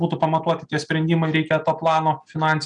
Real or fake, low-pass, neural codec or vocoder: real; 9.9 kHz; none